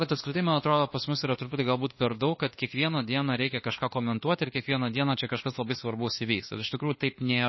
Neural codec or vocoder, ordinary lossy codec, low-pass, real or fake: codec, 24 kHz, 1.2 kbps, DualCodec; MP3, 24 kbps; 7.2 kHz; fake